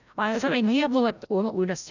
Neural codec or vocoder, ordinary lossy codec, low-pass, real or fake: codec, 16 kHz, 0.5 kbps, FreqCodec, larger model; none; 7.2 kHz; fake